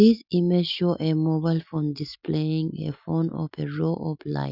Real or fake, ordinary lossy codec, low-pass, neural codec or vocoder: real; none; 5.4 kHz; none